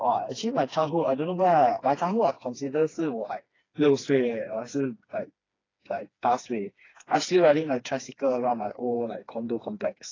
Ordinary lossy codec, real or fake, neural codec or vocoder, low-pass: AAC, 32 kbps; fake; codec, 16 kHz, 2 kbps, FreqCodec, smaller model; 7.2 kHz